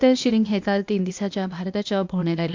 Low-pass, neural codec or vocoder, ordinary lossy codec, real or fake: 7.2 kHz; codec, 16 kHz, 0.8 kbps, ZipCodec; MP3, 64 kbps; fake